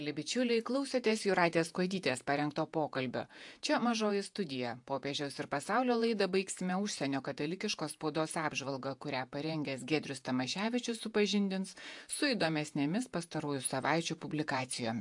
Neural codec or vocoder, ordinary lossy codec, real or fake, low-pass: vocoder, 48 kHz, 128 mel bands, Vocos; AAC, 64 kbps; fake; 10.8 kHz